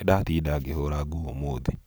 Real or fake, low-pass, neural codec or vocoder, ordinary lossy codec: fake; none; vocoder, 44.1 kHz, 128 mel bands every 512 samples, BigVGAN v2; none